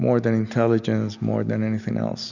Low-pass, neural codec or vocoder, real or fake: 7.2 kHz; none; real